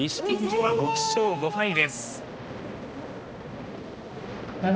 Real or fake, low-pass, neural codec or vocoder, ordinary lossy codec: fake; none; codec, 16 kHz, 1 kbps, X-Codec, HuBERT features, trained on balanced general audio; none